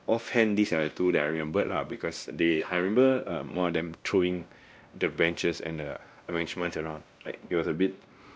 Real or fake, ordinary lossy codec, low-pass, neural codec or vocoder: fake; none; none; codec, 16 kHz, 1 kbps, X-Codec, WavLM features, trained on Multilingual LibriSpeech